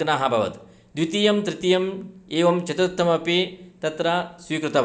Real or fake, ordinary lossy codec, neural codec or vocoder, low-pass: real; none; none; none